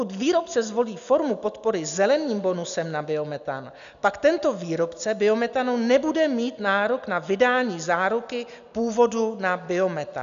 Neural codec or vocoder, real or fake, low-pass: none; real; 7.2 kHz